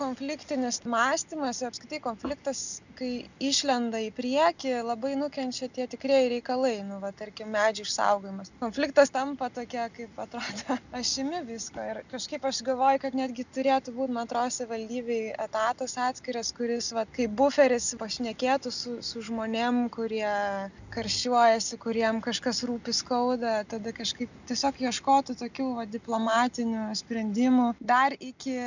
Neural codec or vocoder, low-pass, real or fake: none; 7.2 kHz; real